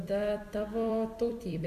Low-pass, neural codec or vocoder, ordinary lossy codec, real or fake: 14.4 kHz; vocoder, 44.1 kHz, 128 mel bands every 256 samples, BigVGAN v2; MP3, 96 kbps; fake